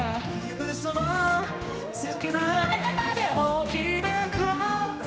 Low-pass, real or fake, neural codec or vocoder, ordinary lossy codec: none; fake; codec, 16 kHz, 1 kbps, X-Codec, HuBERT features, trained on general audio; none